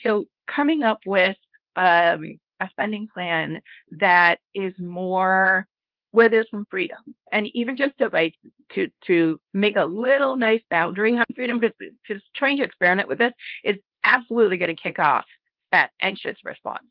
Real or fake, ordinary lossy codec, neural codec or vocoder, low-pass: fake; Opus, 32 kbps; codec, 24 kHz, 0.9 kbps, WavTokenizer, small release; 5.4 kHz